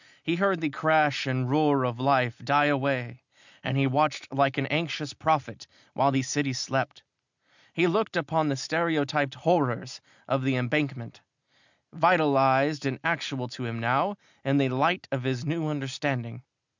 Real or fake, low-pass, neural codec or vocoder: real; 7.2 kHz; none